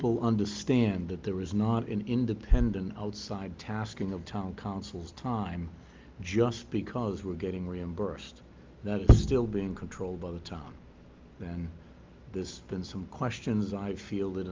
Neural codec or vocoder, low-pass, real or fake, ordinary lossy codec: none; 7.2 kHz; real; Opus, 32 kbps